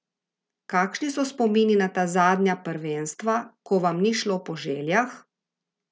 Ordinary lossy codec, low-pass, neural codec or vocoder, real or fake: none; none; none; real